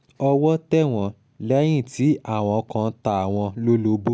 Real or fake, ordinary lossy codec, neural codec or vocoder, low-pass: real; none; none; none